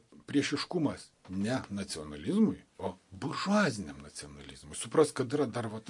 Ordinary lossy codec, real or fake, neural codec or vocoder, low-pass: MP3, 48 kbps; real; none; 10.8 kHz